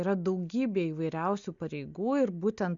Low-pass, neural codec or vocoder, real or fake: 7.2 kHz; none; real